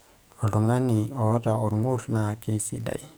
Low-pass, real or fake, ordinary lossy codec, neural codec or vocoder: none; fake; none; codec, 44.1 kHz, 2.6 kbps, SNAC